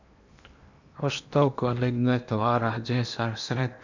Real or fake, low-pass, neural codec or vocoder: fake; 7.2 kHz; codec, 16 kHz in and 24 kHz out, 0.8 kbps, FocalCodec, streaming, 65536 codes